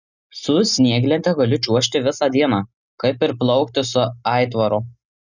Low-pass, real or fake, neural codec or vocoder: 7.2 kHz; real; none